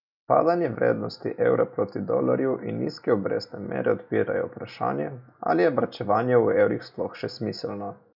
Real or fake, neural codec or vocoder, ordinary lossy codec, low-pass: real; none; none; 5.4 kHz